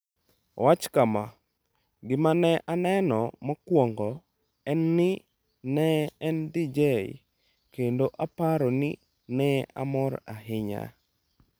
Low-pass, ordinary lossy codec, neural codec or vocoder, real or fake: none; none; none; real